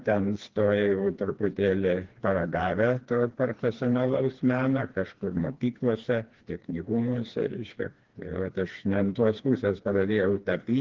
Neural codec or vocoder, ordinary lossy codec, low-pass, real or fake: codec, 16 kHz, 2 kbps, FreqCodec, smaller model; Opus, 16 kbps; 7.2 kHz; fake